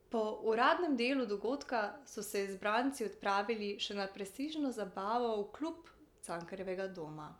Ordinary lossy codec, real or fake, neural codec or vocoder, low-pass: none; real; none; 19.8 kHz